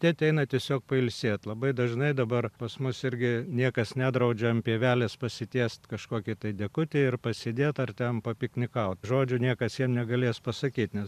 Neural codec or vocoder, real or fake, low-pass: vocoder, 44.1 kHz, 128 mel bands every 512 samples, BigVGAN v2; fake; 14.4 kHz